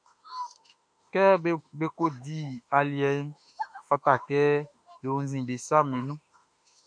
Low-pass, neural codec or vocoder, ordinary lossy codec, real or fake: 9.9 kHz; autoencoder, 48 kHz, 32 numbers a frame, DAC-VAE, trained on Japanese speech; MP3, 64 kbps; fake